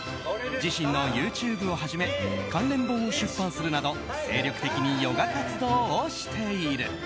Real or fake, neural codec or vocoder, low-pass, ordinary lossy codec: real; none; none; none